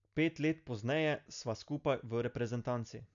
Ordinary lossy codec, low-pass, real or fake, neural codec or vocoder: none; 7.2 kHz; real; none